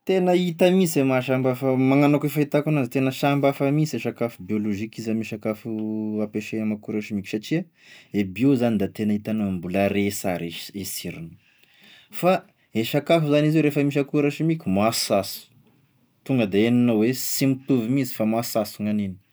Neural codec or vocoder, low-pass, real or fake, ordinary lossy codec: none; none; real; none